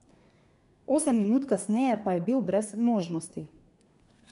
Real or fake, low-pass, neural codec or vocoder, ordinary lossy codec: fake; 10.8 kHz; codec, 24 kHz, 1 kbps, SNAC; none